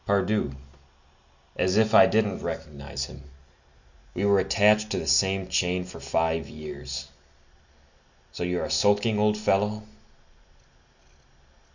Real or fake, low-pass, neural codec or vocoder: real; 7.2 kHz; none